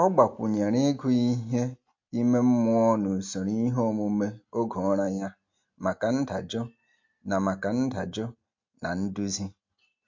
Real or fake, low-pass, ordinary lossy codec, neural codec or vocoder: real; 7.2 kHz; MP3, 48 kbps; none